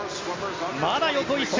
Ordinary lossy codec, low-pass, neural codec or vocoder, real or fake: Opus, 32 kbps; 7.2 kHz; none; real